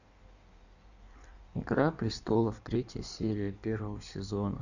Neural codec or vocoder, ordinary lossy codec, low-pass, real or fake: codec, 16 kHz in and 24 kHz out, 1.1 kbps, FireRedTTS-2 codec; none; 7.2 kHz; fake